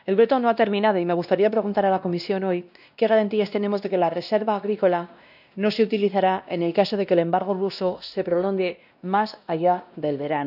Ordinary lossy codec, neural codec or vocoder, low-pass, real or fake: none; codec, 16 kHz, 1 kbps, X-Codec, WavLM features, trained on Multilingual LibriSpeech; 5.4 kHz; fake